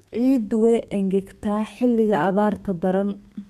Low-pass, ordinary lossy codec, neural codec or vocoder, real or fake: 14.4 kHz; none; codec, 32 kHz, 1.9 kbps, SNAC; fake